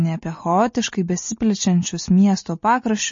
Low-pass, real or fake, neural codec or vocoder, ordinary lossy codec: 7.2 kHz; real; none; MP3, 32 kbps